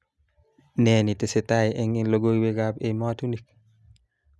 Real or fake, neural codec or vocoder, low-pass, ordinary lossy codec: real; none; none; none